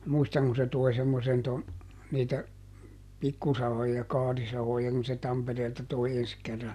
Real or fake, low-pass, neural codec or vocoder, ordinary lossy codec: real; 14.4 kHz; none; none